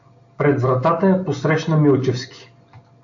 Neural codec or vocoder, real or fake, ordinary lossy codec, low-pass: none; real; AAC, 64 kbps; 7.2 kHz